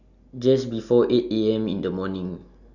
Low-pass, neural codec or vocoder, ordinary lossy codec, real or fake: 7.2 kHz; none; none; real